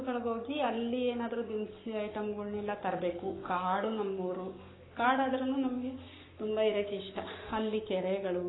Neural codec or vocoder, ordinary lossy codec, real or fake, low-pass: codec, 44.1 kHz, 7.8 kbps, Pupu-Codec; AAC, 16 kbps; fake; 7.2 kHz